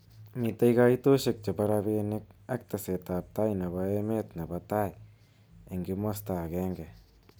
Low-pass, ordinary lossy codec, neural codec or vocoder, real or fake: none; none; none; real